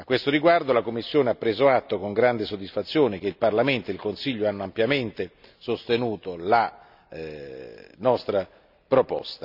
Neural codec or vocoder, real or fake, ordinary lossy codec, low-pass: none; real; none; 5.4 kHz